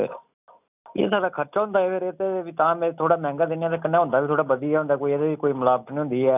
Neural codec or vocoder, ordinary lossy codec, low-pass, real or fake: none; none; 3.6 kHz; real